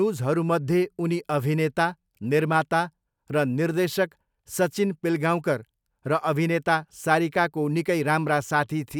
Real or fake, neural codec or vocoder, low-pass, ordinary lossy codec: real; none; 19.8 kHz; none